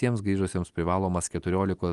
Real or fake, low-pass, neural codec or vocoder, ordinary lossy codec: real; 10.8 kHz; none; Opus, 32 kbps